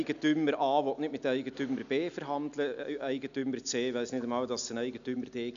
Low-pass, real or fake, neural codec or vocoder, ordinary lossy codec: 7.2 kHz; real; none; none